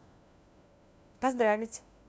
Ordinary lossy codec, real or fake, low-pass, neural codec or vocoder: none; fake; none; codec, 16 kHz, 1 kbps, FunCodec, trained on LibriTTS, 50 frames a second